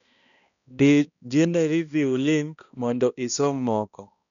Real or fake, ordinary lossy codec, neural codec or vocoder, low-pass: fake; none; codec, 16 kHz, 1 kbps, X-Codec, HuBERT features, trained on balanced general audio; 7.2 kHz